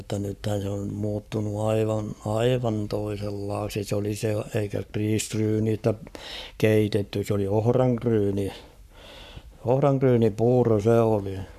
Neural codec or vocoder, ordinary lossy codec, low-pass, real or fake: codec, 44.1 kHz, 7.8 kbps, Pupu-Codec; none; 14.4 kHz; fake